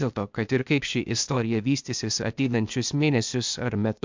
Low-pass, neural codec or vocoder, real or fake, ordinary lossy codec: 7.2 kHz; codec, 16 kHz, 0.8 kbps, ZipCodec; fake; MP3, 64 kbps